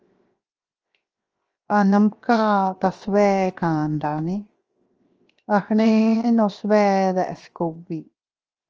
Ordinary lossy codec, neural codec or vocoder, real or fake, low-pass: Opus, 24 kbps; codec, 16 kHz, 0.7 kbps, FocalCodec; fake; 7.2 kHz